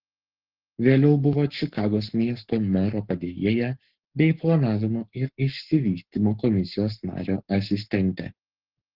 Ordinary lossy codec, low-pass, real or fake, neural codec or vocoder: Opus, 16 kbps; 5.4 kHz; fake; codec, 44.1 kHz, 7.8 kbps, Pupu-Codec